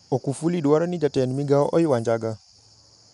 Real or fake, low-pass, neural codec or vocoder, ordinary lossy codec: real; 10.8 kHz; none; none